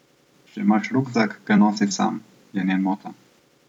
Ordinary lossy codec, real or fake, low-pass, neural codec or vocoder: none; fake; 19.8 kHz; vocoder, 44.1 kHz, 128 mel bands every 256 samples, BigVGAN v2